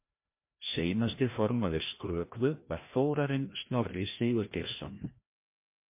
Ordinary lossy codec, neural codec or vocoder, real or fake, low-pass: MP3, 24 kbps; codec, 16 kHz, 1 kbps, FreqCodec, larger model; fake; 3.6 kHz